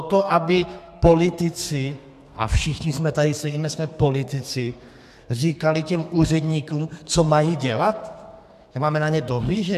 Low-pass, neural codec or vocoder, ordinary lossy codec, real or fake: 14.4 kHz; codec, 32 kHz, 1.9 kbps, SNAC; AAC, 96 kbps; fake